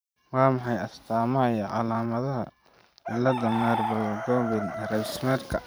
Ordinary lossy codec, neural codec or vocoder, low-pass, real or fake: none; vocoder, 44.1 kHz, 128 mel bands every 512 samples, BigVGAN v2; none; fake